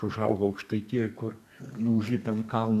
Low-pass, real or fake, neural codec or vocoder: 14.4 kHz; fake; codec, 32 kHz, 1.9 kbps, SNAC